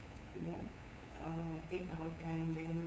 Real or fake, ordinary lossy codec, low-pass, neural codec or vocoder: fake; none; none; codec, 16 kHz, 8 kbps, FunCodec, trained on LibriTTS, 25 frames a second